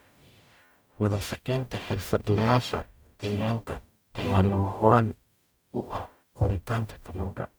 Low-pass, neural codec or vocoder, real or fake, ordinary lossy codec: none; codec, 44.1 kHz, 0.9 kbps, DAC; fake; none